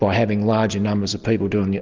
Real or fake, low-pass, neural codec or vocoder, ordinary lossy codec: real; 7.2 kHz; none; Opus, 32 kbps